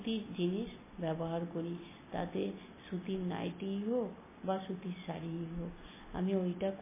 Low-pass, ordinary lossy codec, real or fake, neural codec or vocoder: 3.6 kHz; MP3, 16 kbps; real; none